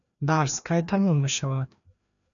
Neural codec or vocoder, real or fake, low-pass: codec, 16 kHz, 1 kbps, FreqCodec, larger model; fake; 7.2 kHz